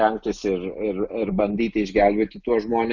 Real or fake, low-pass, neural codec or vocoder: real; 7.2 kHz; none